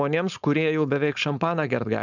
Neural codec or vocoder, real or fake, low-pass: codec, 16 kHz, 4.8 kbps, FACodec; fake; 7.2 kHz